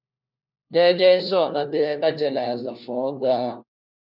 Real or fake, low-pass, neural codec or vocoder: fake; 5.4 kHz; codec, 16 kHz, 1 kbps, FunCodec, trained on LibriTTS, 50 frames a second